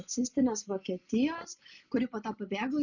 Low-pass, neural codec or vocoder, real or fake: 7.2 kHz; none; real